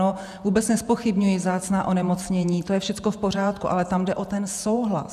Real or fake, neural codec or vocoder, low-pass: fake; vocoder, 48 kHz, 128 mel bands, Vocos; 14.4 kHz